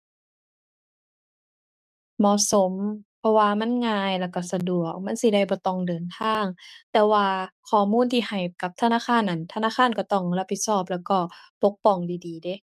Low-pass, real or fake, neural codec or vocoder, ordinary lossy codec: 14.4 kHz; fake; codec, 44.1 kHz, 7.8 kbps, DAC; none